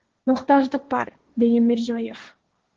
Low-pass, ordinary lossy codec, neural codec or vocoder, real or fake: 7.2 kHz; Opus, 16 kbps; codec, 16 kHz, 1.1 kbps, Voila-Tokenizer; fake